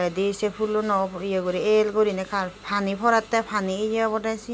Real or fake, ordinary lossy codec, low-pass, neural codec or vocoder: real; none; none; none